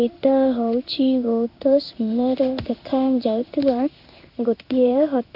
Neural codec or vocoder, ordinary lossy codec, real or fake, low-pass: codec, 16 kHz in and 24 kHz out, 1 kbps, XY-Tokenizer; none; fake; 5.4 kHz